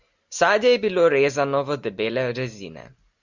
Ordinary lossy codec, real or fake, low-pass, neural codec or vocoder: Opus, 64 kbps; real; 7.2 kHz; none